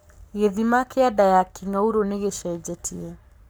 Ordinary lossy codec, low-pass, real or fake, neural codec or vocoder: none; none; fake; codec, 44.1 kHz, 7.8 kbps, Pupu-Codec